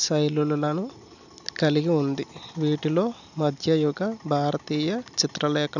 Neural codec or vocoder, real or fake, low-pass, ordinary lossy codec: none; real; 7.2 kHz; none